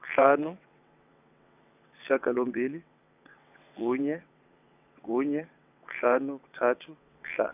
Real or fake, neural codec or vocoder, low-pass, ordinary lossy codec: fake; vocoder, 22.05 kHz, 80 mel bands, WaveNeXt; 3.6 kHz; none